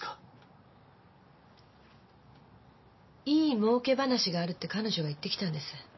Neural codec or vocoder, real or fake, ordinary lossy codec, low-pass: none; real; MP3, 24 kbps; 7.2 kHz